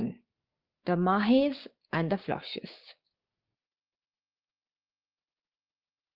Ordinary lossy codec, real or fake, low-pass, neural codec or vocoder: Opus, 24 kbps; fake; 5.4 kHz; codec, 16 kHz, 6 kbps, DAC